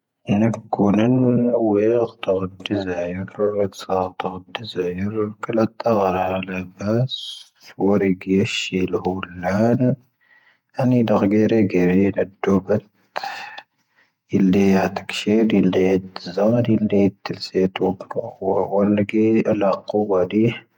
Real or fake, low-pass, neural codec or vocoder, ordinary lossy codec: fake; 19.8 kHz; vocoder, 48 kHz, 128 mel bands, Vocos; none